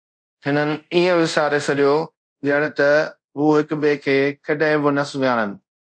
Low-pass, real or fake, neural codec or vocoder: 9.9 kHz; fake; codec, 24 kHz, 0.5 kbps, DualCodec